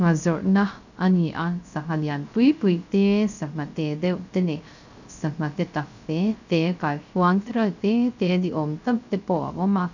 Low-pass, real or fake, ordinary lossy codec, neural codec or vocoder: 7.2 kHz; fake; none; codec, 16 kHz, 0.3 kbps, FocalCodec